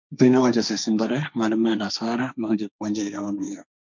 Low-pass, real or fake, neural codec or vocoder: 7.2 kHz; fake; codec, 16 kHz, 1.1 kbps, Voila-Tokenizer